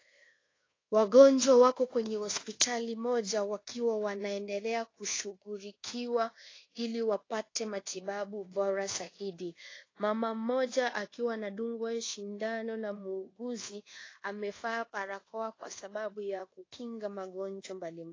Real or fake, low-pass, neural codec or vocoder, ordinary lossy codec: fake; 7.2 kHz; codec, 24 kHz, 1.2 kbps, DualCodec; AAC, 32 kbps